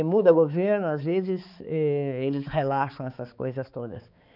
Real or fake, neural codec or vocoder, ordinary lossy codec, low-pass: fake; codec, 16 kHz, 4 kbps, X-Codec, HuBERT features, trained on balanced general audio; none; 5.4 kHz